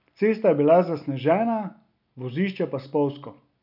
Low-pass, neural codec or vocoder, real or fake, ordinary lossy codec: 5.4 kHz; none; real; none